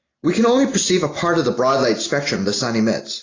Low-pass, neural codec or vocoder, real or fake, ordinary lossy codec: 7.2 kHz; none; real; AAC, 32 kbps